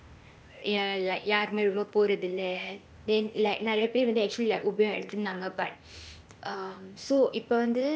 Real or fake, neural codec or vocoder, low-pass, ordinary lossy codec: fake; codec, 16 kHz, 0.8 kbps, ZipCodec; none; none